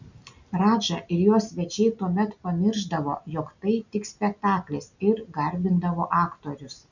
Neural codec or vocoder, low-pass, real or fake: none; 7.2 kHz; real